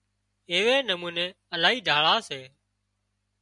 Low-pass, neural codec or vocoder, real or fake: 10.8 kHz; none; real